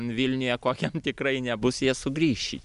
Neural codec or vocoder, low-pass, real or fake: none; 10.8 kHz; real